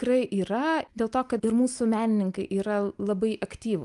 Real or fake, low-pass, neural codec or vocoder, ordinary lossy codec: real; 10.8 kHz; none; Opus, 32 kbps